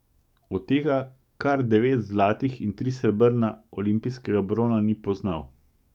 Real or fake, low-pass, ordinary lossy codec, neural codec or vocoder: fake; 19.8 kHz; none; codec, 44.1 kHz, 7.8 kbps, DAC